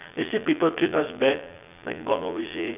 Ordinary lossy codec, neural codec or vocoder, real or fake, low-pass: none; vocoder, 22.05 kHz, 80 mel bands, Vocos; fake; 3.6 kHz